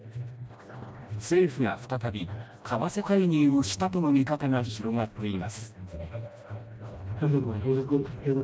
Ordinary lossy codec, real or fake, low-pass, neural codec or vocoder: none; fake; none; codec, 16 kHz, 1 kbps, FreqCodec, smaller model